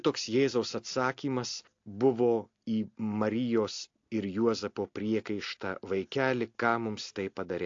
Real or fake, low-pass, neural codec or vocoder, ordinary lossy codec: real; 7.2 kHz; none; AAC, 48 kbps